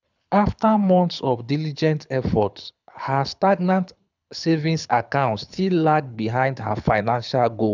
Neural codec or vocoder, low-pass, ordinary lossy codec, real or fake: codec, 24 kHz, 6 kbps, HILCodec; 7.2 kHz; none; fake